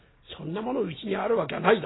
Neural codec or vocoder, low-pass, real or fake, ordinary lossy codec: none; 7.2 kHz; real; AAC, 16 kbps